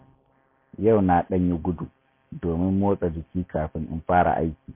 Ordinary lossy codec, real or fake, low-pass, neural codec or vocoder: MP3, 24 kbps; real; 3.6 kHz; none